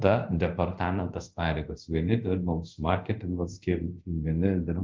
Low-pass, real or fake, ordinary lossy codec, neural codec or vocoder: 7.2 kHz; fake; Opus, 32 kbps; codec, 24 kHz, 0.5 kbps, DualCodec